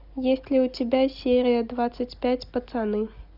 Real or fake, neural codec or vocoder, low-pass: real; none; 5.4 kHz